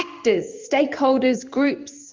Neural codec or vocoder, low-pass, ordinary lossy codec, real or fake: codec, 16 kHz in and 24 kHz out, 1 kbps, XY-Tokenizer; 7.2 kHz; Opus, 24 kbps; fake